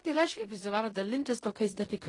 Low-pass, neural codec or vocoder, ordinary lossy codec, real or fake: 10.8 kHz; codec, 16 kHz in and 24 kHz out, 0.4 kbps, LongCat-Audio-Codec, fine tuned four codebook decoder; AAC, 32 kbps; fake